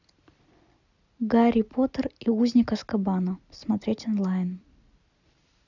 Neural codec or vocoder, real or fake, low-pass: none; real; 7.2 kHz